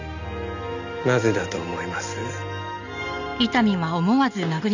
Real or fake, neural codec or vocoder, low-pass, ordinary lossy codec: real; none; 7.2 kHz; none